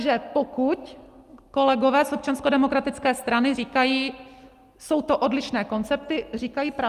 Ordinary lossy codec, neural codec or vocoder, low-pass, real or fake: Opus, 32 kbps; none; 14.4 kHz; real